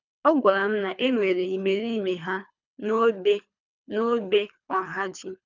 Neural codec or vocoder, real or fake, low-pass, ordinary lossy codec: codec, 24 kHz, 3 kbps, HILCodec; fake; 7.2 kHz; none